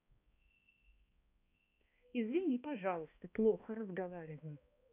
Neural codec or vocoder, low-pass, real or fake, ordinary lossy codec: codec, 16 kHz, 1 kbps, X-Codec, HuBERT features, trained on balanced general audio; 3.6 kHz; fake; none